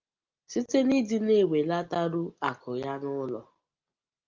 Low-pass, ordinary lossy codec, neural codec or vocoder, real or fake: 7.2 kHz; Opus, 24 kbps; none; real